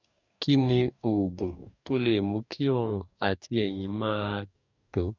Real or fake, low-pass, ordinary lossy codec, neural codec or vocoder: fake; 7.2 kHz; none; codec, 44.1 kHz, 2.6 kbps, DAC